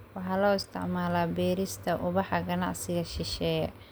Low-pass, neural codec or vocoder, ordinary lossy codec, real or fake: none; none; none; real